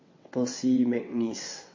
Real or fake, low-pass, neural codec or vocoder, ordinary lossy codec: fake; 7.2 kHz; vocoder, 22.05 kHz, 80 mel bands, WaveNeXt; MP3, 32 kbps